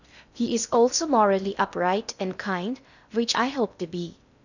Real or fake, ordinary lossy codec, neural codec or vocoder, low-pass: fake; none; codec, 16 kHz in and 24 kHz out, 0.6 kbps, FocalCodec, streaming, 2048 codes; 7.2 kHz